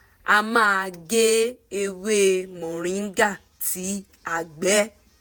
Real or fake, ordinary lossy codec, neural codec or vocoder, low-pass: fake; none; vocoder, 48 kHz, 128 mel bands, Vocos; none